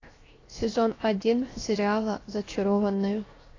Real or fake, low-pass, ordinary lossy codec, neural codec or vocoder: fake; 7.2 kHz; AAC, 32 kbps; codec, 16 kHz, 0.7 kbps, FocalCodec